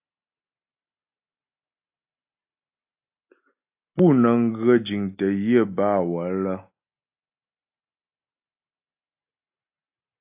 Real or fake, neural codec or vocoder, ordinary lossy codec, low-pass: real; none; AAC, 32 kbps; 3.6 kHz